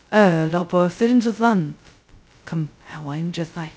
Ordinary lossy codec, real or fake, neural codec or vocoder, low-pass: none; fake; codec, 16 kHz, 0.2 kbps, FocalCodec; none